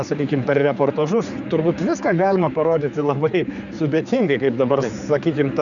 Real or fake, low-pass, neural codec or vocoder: fake; 7.2 kHz; codec, 16 kHz, 4 kbps, X-Codec, HuBERT features, trained on general audio